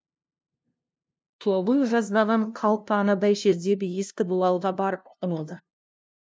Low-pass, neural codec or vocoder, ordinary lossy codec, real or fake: none; codec, 16 kHz, 0.5 kbps, FunCodec, trained on LibriTTS, 25 frames a second; none; fake